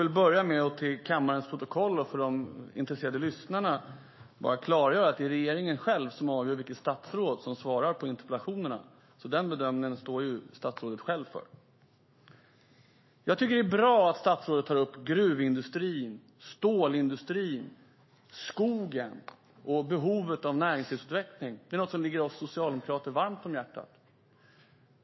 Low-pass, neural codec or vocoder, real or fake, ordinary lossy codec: 7.2 kHz; autoencoder, 48 kHz, 128 numbers a frame, DAC-VAE, trained on Japanese speech; fake; MP3, 24 kbps